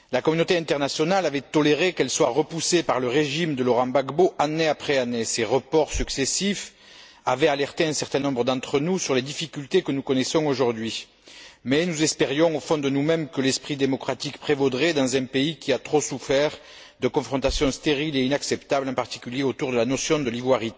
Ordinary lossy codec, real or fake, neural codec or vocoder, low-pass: none; real; none; none